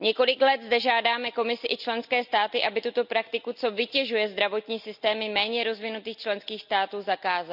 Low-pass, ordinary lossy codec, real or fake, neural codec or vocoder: 5.4 kHz; none; real; none